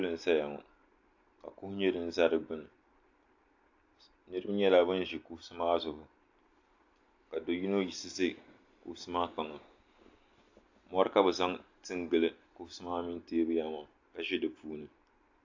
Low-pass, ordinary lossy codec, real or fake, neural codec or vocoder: 7.2 kHz; AAC, 48 kbps; real; none